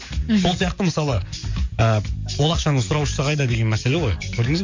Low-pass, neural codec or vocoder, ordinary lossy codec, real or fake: 7.2 kHz; codec, 44.1 kHz, 7.8 kbps, DAC; MP3, 48 kbps; fake